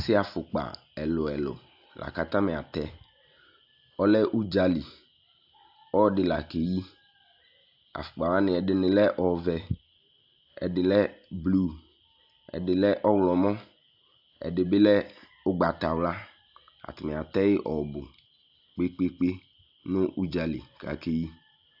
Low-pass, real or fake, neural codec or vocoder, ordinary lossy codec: 5.4 kHz; real; none; AAC, 48 kbps